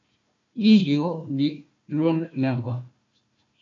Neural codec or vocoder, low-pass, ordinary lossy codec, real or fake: codec, 16 kHz, 1 kbps, FunCodec, trained on Chinese and English, 50 frames a second; 7.2 kHz; AAC, 48 kbps; fake